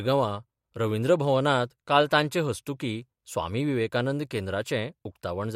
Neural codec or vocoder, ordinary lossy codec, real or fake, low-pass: vocoder, 44.1 kHz, 128 mel bands every 256 samples, BigVGAN v2; MP3, 64 kbps; fake; 19.8 kHz